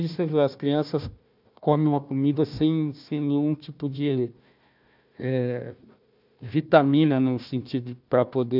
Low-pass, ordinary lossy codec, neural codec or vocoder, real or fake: 5.4 kHz; none; codec, 16 kHz, 1 kbps, FunCodec, trained on Chinese and English, 50 frames a second; fake